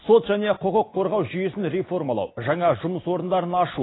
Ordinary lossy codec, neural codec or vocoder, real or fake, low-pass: AAC, 16 kbps; none; real; 7.2 kHz